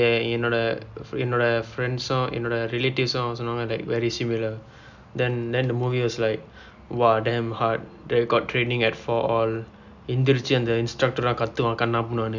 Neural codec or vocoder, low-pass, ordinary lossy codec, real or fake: none; 7.2 kHz; none; real